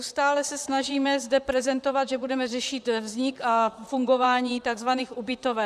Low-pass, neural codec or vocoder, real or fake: 14.4 kHz; vocoder, 44.1 kHz, 128 mel bands, Pupu-Vocoder; fake